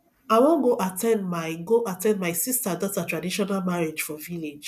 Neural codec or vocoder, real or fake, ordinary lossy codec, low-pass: none; real; none; 14.4 kHz